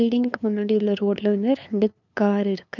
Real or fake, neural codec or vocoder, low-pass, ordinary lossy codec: fake; codec, 16 kHz, 2 kbps, FunCodec, trained on LibriTTS, 25 frames a second; 7.2 kHz; none